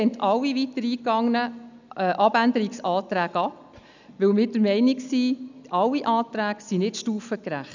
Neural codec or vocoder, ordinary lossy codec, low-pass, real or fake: none; none; 7.2 kHz; real